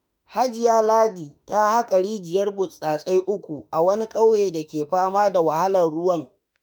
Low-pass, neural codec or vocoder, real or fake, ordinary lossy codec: none; autoencoder, 48 kHz, 32 numbers a frame, DAC-VAE, trained on Japanese speech; fake; none